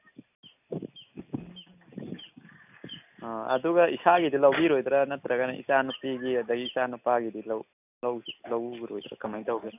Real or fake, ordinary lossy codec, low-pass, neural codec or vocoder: real; none; 3.6 kHz; none